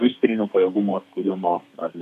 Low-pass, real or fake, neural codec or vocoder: 14.4 kHz; fake; codec, 32 kHz, 1.9 kbps, SNAC